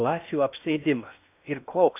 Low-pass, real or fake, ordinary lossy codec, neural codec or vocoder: 3.6 kHz; fake; AAC, 32 kbps; codec, 16 kHz in and 24 kHz out, 0.6 kbps, FocalCodec, streaming, 2048 codes